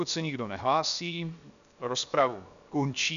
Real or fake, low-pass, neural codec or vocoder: fake; 7.2 kHz; codec, 16 kHz, 0.7 kbps, FocalCodec